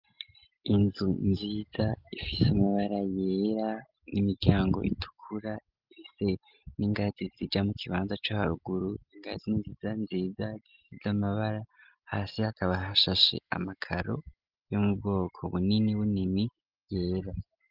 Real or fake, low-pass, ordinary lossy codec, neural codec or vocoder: real; 5.4 kHz; Opus, 32 kbps; none